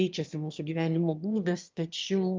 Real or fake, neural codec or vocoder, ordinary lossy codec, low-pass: fake; autoencoder, 22.05 kHz, a latent of 192 numbers a frame, VITS, trained on one speaker; Opus, 32 kbps; 7.2 kHz